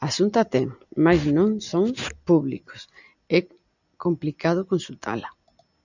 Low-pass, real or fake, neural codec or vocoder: 7.2 kHz; real; none